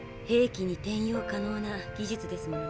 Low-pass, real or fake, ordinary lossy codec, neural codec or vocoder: none; real; none; none